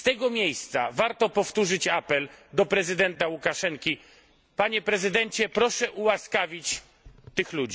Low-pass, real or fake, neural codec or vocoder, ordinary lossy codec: none; real; none; none